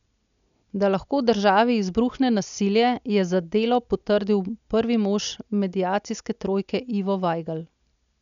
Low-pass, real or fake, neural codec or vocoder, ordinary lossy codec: 7.2 kHz; real; none; none